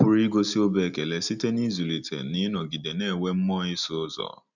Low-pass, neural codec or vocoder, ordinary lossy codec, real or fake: 7.2 kHz; none; none; real